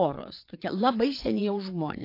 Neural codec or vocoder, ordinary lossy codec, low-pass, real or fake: vocoder, 22.05 kHz, 80 mel bands, WaveNeXt; AAC, 32 kbps; 5.4 kHz; fake